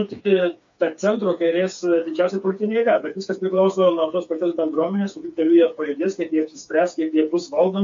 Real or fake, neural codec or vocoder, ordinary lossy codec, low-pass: fake; codec, 16 kHz, 4 kbps, FreqCodec, smaller model; MP3, 48 kbps; 7.2 kHz